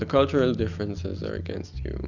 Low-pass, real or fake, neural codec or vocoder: 7.2 kHz; real; none